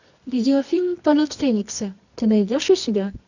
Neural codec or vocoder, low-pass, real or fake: codec, 24 kHz, 0.9 kbps, WavTokenizer, medium music audio release; 7.2 kHz; fake